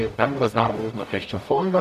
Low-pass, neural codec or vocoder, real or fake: 14.4 kHz; codec, 44.1 kHz, 0.9 kbps, DAC; fake